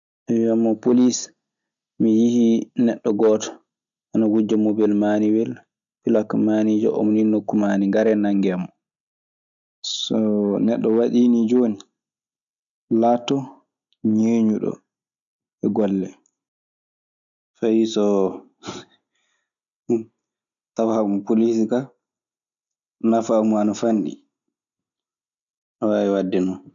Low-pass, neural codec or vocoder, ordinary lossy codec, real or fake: 7.2 kHz; none; none; real